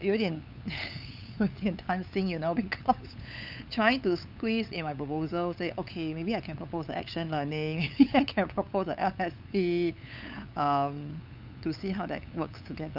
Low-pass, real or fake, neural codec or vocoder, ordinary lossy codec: 5.4 kHz; fake; codec, 16 kHz, 8 kbps, FunCodec, trained on LibriTTS, 25 frames a second; none